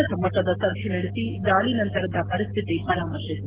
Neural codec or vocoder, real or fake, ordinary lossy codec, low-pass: none; real; Opus, 16 kbps; 3.6 kHz